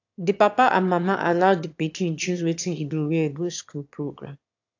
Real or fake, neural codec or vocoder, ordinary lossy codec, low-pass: fake; autoencoder, 22.05 kHz, a latent of 192 numbers a frame, VITS, trained on one speaker; AAC, 48 kbps; 7.2 kHz